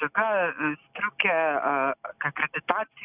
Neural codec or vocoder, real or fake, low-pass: none; real; 3.6 kHz